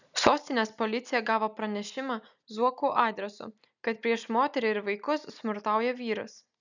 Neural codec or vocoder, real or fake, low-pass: none; real; 7.2 kHz